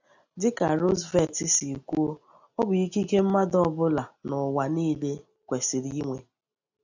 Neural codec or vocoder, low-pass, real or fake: none; 7.2 kHz; real